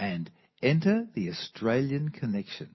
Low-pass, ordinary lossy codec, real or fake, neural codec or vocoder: 7.2 kHz; MP3, 24 kbps; real; none